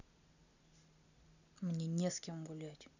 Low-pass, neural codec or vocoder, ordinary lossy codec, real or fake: 7.2 kHz; none; none; real